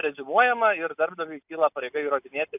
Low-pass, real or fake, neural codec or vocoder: 3.6 kHz; real; none